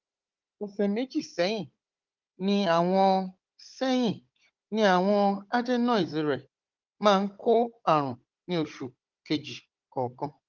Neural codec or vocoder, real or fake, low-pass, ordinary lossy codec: codec, 16 kHz, 16 kbps, FunCodec, trained on Chinese and English, 50 frames a second; fake; 7.2 kHz; Opus, 32 kbps